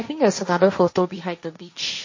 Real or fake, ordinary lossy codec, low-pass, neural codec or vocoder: fake; MP3, 32 kbps; 7.2 kHz; codec, 16 kHz, 1.1 kbps, Voila-Tokenizer